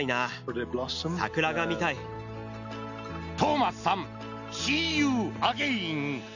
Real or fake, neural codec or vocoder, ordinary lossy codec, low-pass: real; none; none; 7.2 kHz